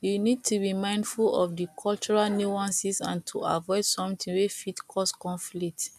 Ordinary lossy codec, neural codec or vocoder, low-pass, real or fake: none; none; 14.4 kHz; real